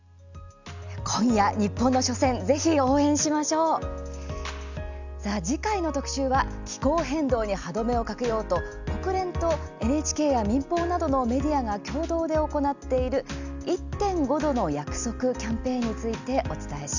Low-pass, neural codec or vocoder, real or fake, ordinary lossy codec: 7.2 kHz; none; real; none